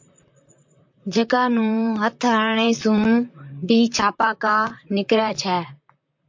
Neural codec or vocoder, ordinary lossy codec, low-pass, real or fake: vocoder, 44.1 kHz, 128 mel bands, Pupu-Vocoder; MP3, 48 kbps; 7.2 kHz; fake